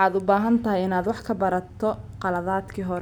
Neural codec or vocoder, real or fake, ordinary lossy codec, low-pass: none; real; none; 19.8 kHz